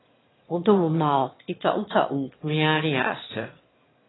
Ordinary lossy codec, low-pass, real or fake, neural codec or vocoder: AAC, 16 kbps; 7.2 kHz; fake; autoencoder, 22.05 kHz, a latent of 192 numbers a frame, VITS, trained on one speaker